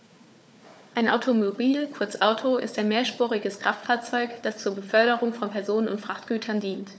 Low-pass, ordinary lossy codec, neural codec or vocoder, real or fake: none; none; codec, 16 kHz, 4 kbps, FunCodec, trained on Chinese and English, 50 frames a second; fake